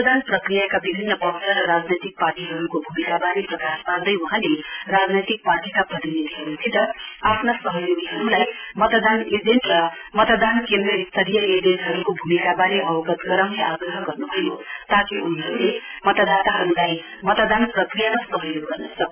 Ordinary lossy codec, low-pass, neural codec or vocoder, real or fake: none; 3.6 kHz; none; real